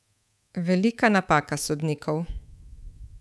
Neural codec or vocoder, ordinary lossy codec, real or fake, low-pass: codec, 24 kHz, 3.1 kbps, DualCodec; none; fake; none